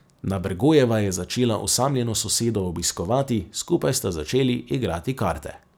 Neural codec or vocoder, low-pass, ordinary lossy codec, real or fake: none; none; none; real